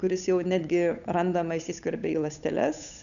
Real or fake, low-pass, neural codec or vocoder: fake; 7.2 kHz; codec, 16 kHz, 8 kbps, FunCodec, trained on Chinese and English, 25 frames a second